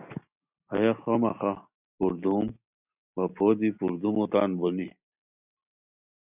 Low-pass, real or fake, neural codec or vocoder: 3.6 kHz; real; none